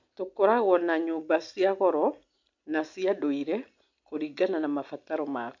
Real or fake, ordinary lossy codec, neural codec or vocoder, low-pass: real; MP3, 64 kbps; none; 7.2 kHz